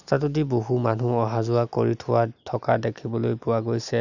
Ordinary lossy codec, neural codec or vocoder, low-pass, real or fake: none; none; 7.2 kHz; real